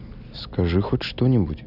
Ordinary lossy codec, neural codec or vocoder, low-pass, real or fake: none; none; 5.4 kHz; real